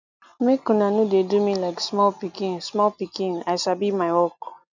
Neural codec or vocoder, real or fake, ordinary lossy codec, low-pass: none; real; none; 7.2 kHz